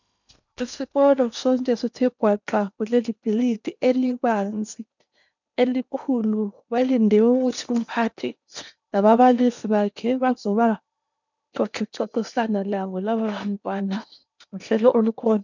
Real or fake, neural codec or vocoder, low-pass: fake; codec, 16 kHz in and 24 kHz out, 0.8 kbps, FocalCodec, streaming, 65536 codes; 7.2 kHz